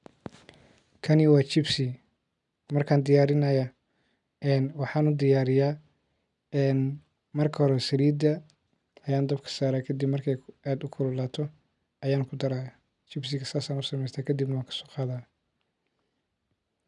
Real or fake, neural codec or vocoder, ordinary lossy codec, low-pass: real; none; none; 10.8 kHz